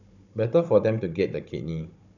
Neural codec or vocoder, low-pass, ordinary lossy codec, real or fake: codec, 16 kHz, 16 kbps, FunCodec, trained on Chinese and English, 50 frames a second; 7.2 kHz; none; fake